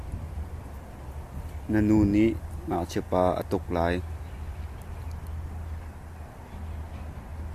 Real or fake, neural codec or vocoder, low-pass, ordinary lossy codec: real; none; 14.4 kHz; Opus, 64 kbps